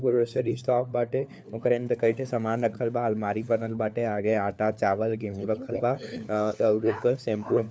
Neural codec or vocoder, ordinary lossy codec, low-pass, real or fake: codec, 16 kHz, 4 kbps, FunCodec, trained on LibriTTS, 50 frames a second; none; none; fake